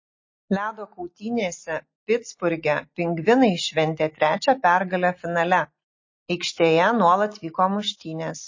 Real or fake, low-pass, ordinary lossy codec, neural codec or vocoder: real; 7.2 kHz; MP3, 32 kbps; none